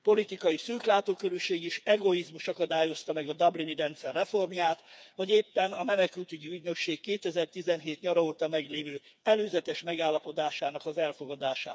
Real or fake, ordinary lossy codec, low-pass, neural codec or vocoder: fake; none; none; codec, 16 kHz, 4 kbps, FreqCodec, smaller model